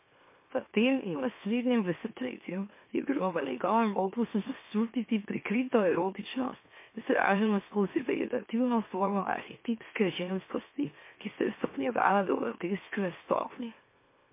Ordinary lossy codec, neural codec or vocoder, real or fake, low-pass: MP3, 24 kbps; autoencoder, 44.1 kHz, a latent of 192 numbers a frame, MeloTTS; fake; 3.6 kHz